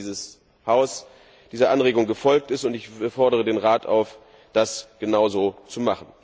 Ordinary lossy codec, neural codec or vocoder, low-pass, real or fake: none; none; none; real